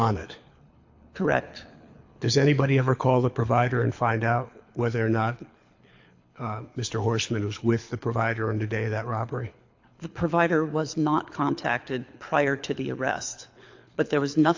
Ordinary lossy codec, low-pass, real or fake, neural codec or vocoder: AAC, 48 kbps; 7.2 kHz; fake; codec, 24 kHz, 6 kbps, HILCodec